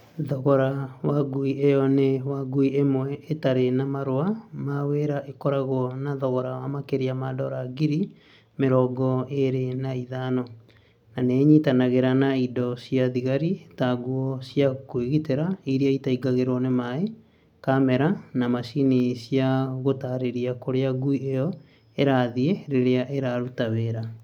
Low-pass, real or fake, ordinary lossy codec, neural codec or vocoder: 19.8 kHz; real; none; none